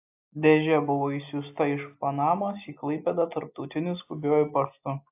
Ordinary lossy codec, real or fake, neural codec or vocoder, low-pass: AAC, 32 kbps; real; none; 3.6 kHz